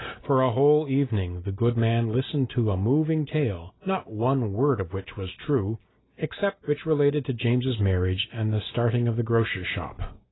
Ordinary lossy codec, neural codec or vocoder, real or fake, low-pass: AAC, 16 kbps; none; real; 7.2 kHz